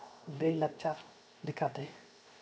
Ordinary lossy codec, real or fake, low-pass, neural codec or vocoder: none; fake; none; codec, 16 kHz, 0.7 kbps, FocalCodec